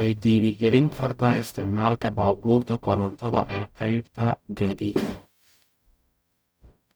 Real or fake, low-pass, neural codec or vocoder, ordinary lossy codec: fake; none; codec, 44.1 kHz, 0.9 kbps, DAC; none